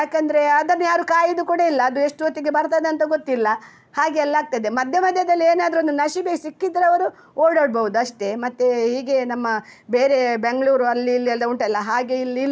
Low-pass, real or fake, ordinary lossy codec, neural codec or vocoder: none; real; none; none